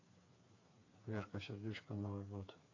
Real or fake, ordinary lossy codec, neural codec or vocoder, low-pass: fake; AAC, 32 kbps; codec, 16 kHz, 4 kbps, FreqCodec, smaller model; 7.2 kHz